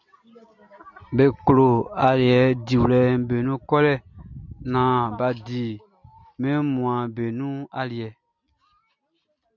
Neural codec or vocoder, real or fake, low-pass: none; real; 7.2 kHz